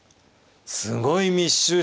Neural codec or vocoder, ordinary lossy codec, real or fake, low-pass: none; none; real; none